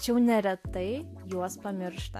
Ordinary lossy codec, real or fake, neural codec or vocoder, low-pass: AAC, 64 kbps; real; none; 14.4 kHz